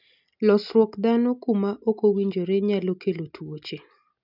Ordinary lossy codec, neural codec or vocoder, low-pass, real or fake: none; none; 5.4 kHz; real